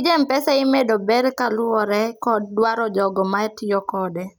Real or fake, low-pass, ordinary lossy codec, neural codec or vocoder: real; none; none; none